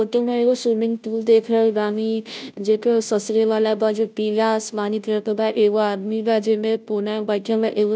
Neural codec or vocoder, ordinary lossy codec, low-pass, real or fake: codec, 16 kHz, 0.5 kbps, FunCodec, trained on Chinese and English, 25 frames a second; none; none; fake